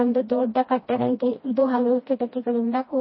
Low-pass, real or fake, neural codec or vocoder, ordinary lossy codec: 7.2 kHz; fake; codec, 16 kHz, 1 kbps, FreqCodec, smaller model; MP3, 24 kbps